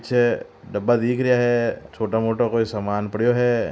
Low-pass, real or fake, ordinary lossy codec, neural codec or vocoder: none; real; none; none